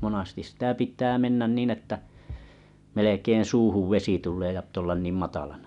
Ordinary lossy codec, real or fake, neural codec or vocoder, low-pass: none; real; none; 10.8 kHz